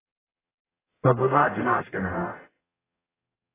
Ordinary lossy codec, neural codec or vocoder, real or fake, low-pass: AAC, 16 kbps; codec, 44.1 kHz, 0.9 kbps, DAC; fake; 3.6 kHz